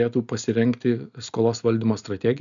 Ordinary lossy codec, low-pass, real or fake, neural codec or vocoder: MP3, 96 kbps; 7.2 kHz; real; none